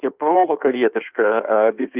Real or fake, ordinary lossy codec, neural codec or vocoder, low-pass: fake; Opus, 24 kbps; codec, 16 kHz in and 24 kHz out, 1.1 kbps, FireRedTTS-2 codec; 3.6 kHz